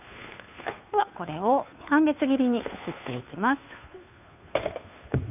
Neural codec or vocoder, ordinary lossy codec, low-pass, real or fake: codec, 16 kHz, 2 kbps, FunCodec, trained on Chinese and English, 25 frames a second; none; 3.6 kHz; fake